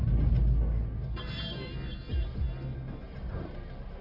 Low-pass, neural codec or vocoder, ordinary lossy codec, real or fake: 5.4 kHz; codec, 44.1 kHz, 1.7 kbps, Pupu-Codec; AAC, 48 kbps; fake